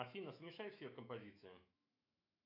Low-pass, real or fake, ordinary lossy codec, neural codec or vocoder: 5.4 kHz; fake; AAC, 32 kbps; autoencoder, 48 kHz, 128 numbers a frame, DAC-VAE, trained on Japanese speech